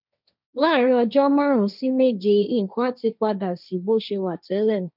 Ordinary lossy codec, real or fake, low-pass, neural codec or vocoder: none; fake; 5.4 kHz; codec, 16 kHz, 1.1 kbps, Voila-Tokenizer